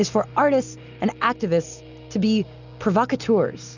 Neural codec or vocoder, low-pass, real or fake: none; 7.2 kHz; real